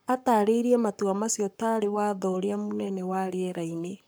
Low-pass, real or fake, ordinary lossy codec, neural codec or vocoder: none; fake; none; codec, 44.1 kHz, 7.8 kbps, Pupu-Codec